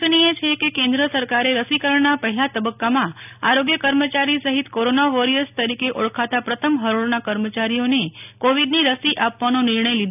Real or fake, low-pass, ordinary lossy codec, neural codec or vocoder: real; 3.6 kHz; none; none